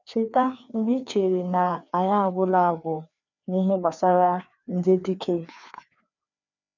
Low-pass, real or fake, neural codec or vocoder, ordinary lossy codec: 7.2 kHz; fake; codec, 16 kHz, 2 kbps, FreqCodec, larger model; none